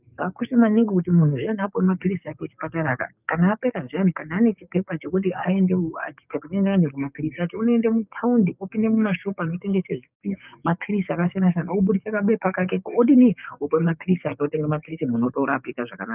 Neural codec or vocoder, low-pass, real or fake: codec, 44.1 kHz, 7.8 kbps, DAC; 3.6 kHz; fake